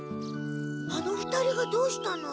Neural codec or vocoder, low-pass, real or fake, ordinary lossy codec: none; none; real; none